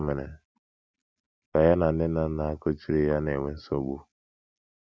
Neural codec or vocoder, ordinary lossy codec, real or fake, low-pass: none; none; real; none